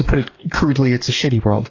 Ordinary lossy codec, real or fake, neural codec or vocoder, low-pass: AAC, 32 kbps; fake; codec, 44.1 kHz, 2.6 kbps, DAC; 7.2 kHz